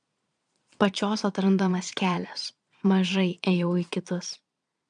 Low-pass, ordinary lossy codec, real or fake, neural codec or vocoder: 9.9 kHz; MP3, 96 kbps; fake; vocoder, 22.05 kHz, 80 mel bands, Vocos